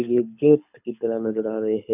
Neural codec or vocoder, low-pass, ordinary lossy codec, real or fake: codec, 24 kHz, 6 kbps, HILCodec; 3.6 kHz; none; fake